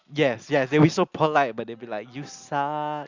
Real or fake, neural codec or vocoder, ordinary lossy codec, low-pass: real; none; Opus, 64 kbps; 7.2 kHz